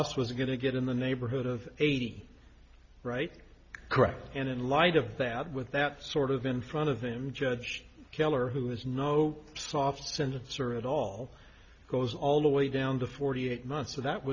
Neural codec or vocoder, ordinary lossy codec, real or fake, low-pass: none; Opus, 64 kbps; real; 7.2 kHz